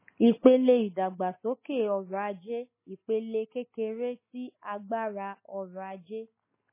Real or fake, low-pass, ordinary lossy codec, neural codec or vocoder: real; 3.6 kHz; MP3, 16 kbps; none